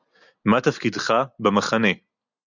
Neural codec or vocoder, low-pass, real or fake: none; 7.2 kHz; real